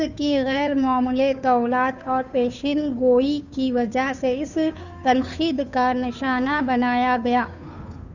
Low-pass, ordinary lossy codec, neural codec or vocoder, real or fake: 7.2 kHz; none; codec, 16 kHz, 2 kbps, FunCodec, trained on Chinese and English, 25 frames a second; fake